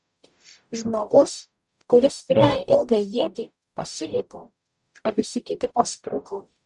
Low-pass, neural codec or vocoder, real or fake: 10.8 kHz; codec, 44.1 kHz, 0.9 kbps, DAC; fake